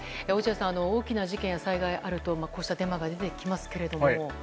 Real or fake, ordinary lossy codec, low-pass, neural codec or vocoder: real; none; none; none